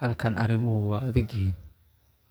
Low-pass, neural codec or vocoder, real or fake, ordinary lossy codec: none; codec, 44.1 kHz, 2.6 kbps, SNAC; fake; none